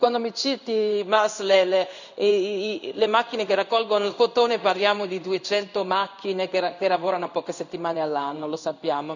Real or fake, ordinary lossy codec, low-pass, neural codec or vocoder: fake; none; 7.2 kHz; codec, 16 kHz in and 24 kHz out, 1 kbps, XY-Tokenizer